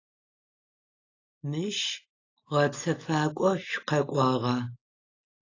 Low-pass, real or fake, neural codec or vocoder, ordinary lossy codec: 7.2 kHz; real; none; AAC, 32 kbps